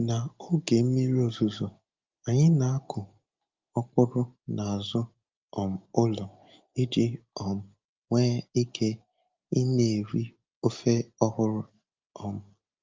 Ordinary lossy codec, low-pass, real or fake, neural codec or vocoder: Opus, 24 kbps; 7.2 kHz; real; none